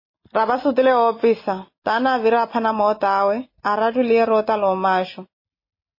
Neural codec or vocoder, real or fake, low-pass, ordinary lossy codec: none; real; 5.4 kHz; MP3, 24 kbps